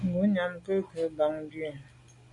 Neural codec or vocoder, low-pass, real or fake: none; 10.8 kHz; real